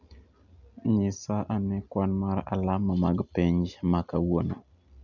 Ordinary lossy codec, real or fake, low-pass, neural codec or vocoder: none; real; 7.2 kHz; none